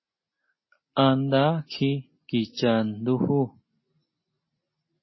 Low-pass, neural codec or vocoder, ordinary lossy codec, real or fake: 7.2 kHz; none; MP3, 24 kbps; real